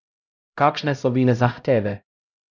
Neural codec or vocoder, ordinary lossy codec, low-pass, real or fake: codec, 16 kHz, 0.5 kbps, X-Codec, HuBERT features, trained on LibriSpeech; none; none; fake